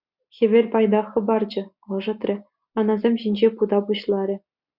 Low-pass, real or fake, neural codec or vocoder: 5.4 kHz; real; none